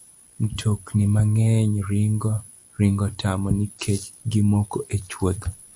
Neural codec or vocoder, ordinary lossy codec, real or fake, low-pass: none; MP3, 96 kbps; real; 10.8 kHz